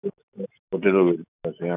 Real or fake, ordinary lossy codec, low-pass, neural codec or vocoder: real; none; 3.6 kHz; none